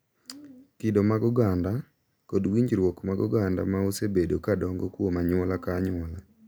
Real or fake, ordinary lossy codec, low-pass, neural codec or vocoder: real; none; none; none